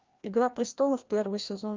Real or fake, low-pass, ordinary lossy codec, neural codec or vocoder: fake; 7.2 kHz; Opus, 24 kbps; codec, 16 kHz, 1 kbps, FreqCodec, larger model